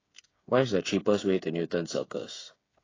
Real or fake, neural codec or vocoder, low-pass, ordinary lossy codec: fake; codec, 16 kHz, 8 kbps, FreqCodec, smaller model; 7.2 kHz; AAC, 32 kbps